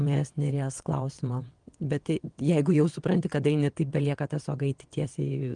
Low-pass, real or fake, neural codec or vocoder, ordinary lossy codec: 9.9 kHz; fake; vocoder, 22.05 kHz, 80 mel bands, WaveNeXt; Opus, 24 kbps